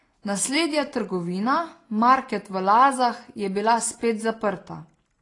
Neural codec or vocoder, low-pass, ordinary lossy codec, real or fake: none; 10.8 kHz; AAC, 32 kbps; real